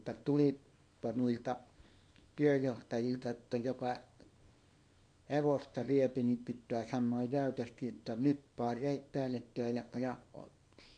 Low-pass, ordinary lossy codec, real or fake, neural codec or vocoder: 9.9 kHz; MP3, 64 kbps; fake; codec, 24 kHz, 0.9 kbps, WavTokenizer, small release